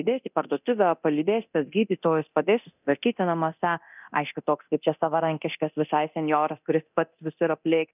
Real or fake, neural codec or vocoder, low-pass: fake; codec, 24 kHz, 0.9 kbps, DualCodec; 3.6 kHz